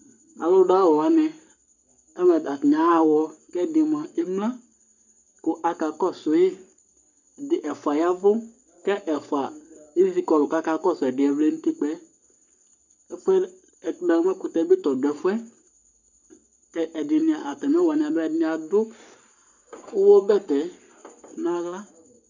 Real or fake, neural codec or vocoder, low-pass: fake; codec, 44.1 kHz, 7.8 kbps, Pupu-Codec; 7.2 kHz